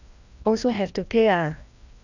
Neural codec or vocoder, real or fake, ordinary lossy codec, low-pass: codec, 16 kHz, 1 kbps, FreqCodec, larger model; fake; none; 7.2 kHz